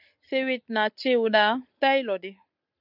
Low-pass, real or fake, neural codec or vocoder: 5.4 kHz; real; none